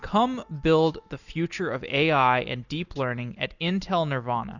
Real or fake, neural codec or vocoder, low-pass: real; none; 7.2 kHz